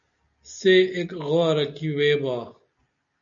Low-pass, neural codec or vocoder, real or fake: 7.2 kHz; none; real